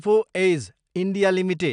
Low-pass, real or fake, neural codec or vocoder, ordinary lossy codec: 9.9 kHz; real; none; none